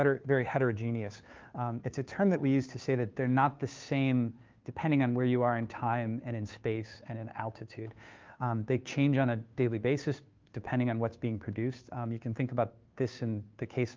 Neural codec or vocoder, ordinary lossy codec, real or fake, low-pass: codec, 16 kHz, 2 kbps, FunCodec, trained on Chinese and English, 25 frames a second; Opus, 24 kbps; fake; 7.2 kHz